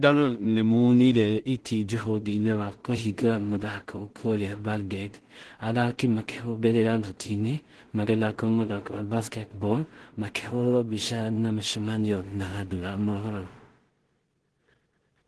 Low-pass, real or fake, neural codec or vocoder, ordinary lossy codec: 10.8 kHz; fake; codec, 16 kHz in and 24 kHz out, 0.4 kbps, LongCat-Audio-Codec, two codebook decoder; Opus, 16 kbps